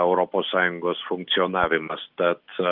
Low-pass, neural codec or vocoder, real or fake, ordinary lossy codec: 5.4 kHz; none; real; Opus, 32 kbps